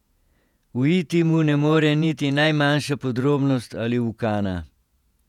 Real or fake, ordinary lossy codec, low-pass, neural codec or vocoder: fake; none; 19.8 kHz; vocoder, 48 kHz, 128 mel bands, Vocos